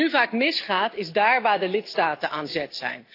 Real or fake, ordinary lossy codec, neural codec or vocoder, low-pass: real; AAC, 32 kbps; none; 5.4 kHz